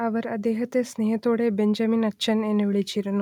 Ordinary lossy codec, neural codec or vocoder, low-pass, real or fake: none; none; 19.8 kHz; real